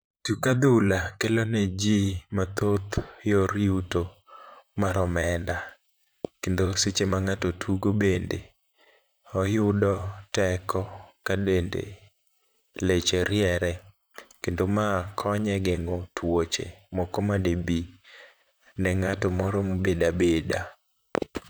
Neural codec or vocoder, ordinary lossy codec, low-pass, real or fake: vocoder, 44.1 kHz, 128 mel bands, Pupu-Vocoder; none; none; fake